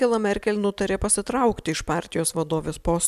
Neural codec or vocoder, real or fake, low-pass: none; real; 14.4 kHz